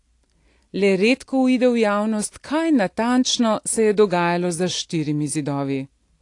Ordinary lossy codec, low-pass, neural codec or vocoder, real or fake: AAC, 48 kbps; 10.8 kHz; none; real